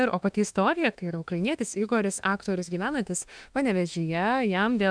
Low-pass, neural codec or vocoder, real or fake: 9.9 kHz; autoencoder, 48 kHz, 32 numbers a frame, DAC-VAE, trained on Japanese speech; fake